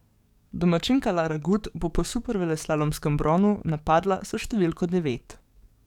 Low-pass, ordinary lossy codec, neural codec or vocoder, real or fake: 19.8 kHz; none; codec, 44.1 kHz, 7.8 kbps, DAC; fake